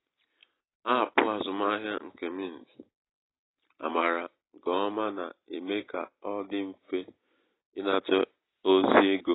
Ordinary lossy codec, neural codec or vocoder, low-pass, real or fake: AAC, 16 kbps; none; 7.2 kHz; real